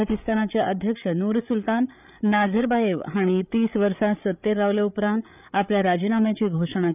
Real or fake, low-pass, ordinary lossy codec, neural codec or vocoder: fake; 3.6 kHz; none; codec, 16 kHz, 8 kbps, FreqCodec, larger model